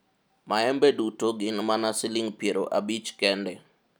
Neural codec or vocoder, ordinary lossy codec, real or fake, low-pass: none; none; real; none